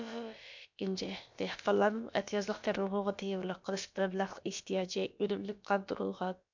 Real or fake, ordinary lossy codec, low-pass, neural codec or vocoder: fake; MP3, 64 kbps; 7.2 kHz; codec, 16 kHz, about 1 kbps, DyCAST, with the encoder's durations